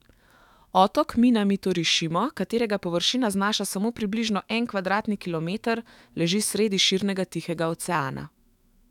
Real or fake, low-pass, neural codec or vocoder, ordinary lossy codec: fake; 19.8 kHz; autoencoder, 48 kHz, 128 numbers a frame, DAC-VAE, trained on Japanese speech; none